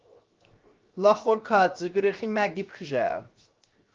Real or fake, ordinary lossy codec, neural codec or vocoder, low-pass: fake; Opus, 24 kbps; codec, 16 kHz, 0.7 kbps, FocalCodec; 7.2 kHz